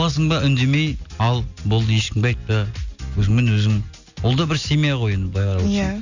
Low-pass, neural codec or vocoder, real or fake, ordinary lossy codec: 7.2 kHz; none; real; none